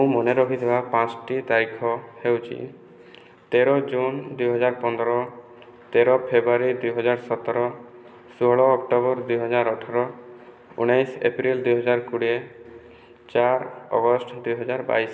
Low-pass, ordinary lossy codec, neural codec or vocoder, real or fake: none; none; none; real